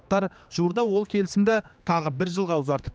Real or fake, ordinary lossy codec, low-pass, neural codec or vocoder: fake; none; none; codec, 16 kHz, 2 kbps, X-Codec, HuBERT features, trained on general audio